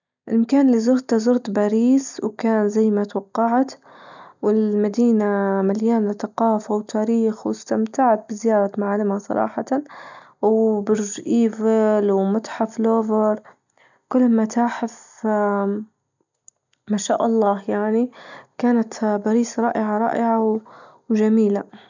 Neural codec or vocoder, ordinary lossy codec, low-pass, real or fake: none; none; 7.2 kHz; real